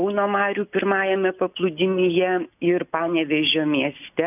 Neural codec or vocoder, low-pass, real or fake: none; 3.6 kHz; real